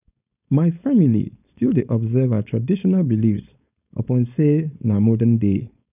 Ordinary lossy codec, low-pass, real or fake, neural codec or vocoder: none; 3.6 kHz; fake; codec, 16 kHz, 4.8 kbps, FACodec